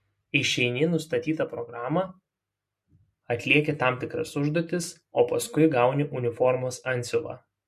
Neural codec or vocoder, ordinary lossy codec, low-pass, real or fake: none; MP3, 64 kbps; 14.4 kHz; real